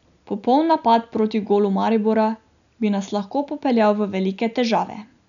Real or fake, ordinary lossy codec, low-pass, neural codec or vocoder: real; none; 7.2 kHz; none